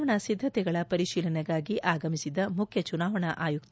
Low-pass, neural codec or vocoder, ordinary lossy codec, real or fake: none; none; none; real